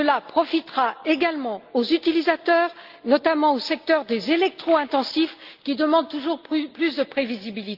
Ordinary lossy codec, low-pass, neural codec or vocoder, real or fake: Opus, 32 kbps; 5.4 kHz; none; real